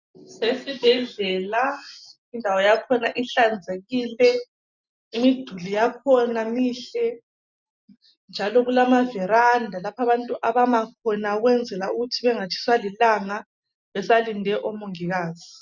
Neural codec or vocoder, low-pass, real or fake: none; 7.2 kHz; real